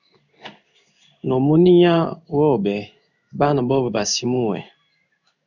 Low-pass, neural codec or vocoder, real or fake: 7.2 kHz; codec, 16 kHz in and 24 kHz out, 1 kbps, XY-Tokenizer; fake